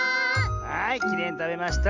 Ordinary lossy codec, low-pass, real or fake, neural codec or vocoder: Opus, 64 kbps; 7.2 kHz; real; none